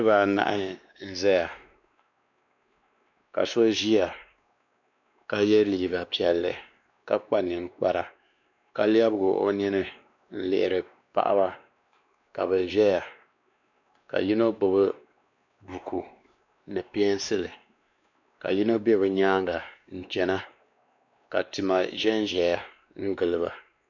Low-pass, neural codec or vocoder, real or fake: 7.2 kHz; codec, 16 kHz, 2 kbps, X-Codec, WavLM features, trained on Multilingual LibriSpeech; fake